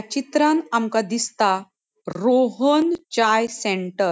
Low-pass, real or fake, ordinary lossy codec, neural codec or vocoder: none; real; none; none